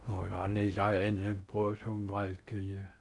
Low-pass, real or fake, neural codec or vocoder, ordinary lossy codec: 10.8 kHz; fake; codec, 16 kHz in and 24 kHz out, 0.6 kbps, FocalCodec, streaming, 4096 codes; none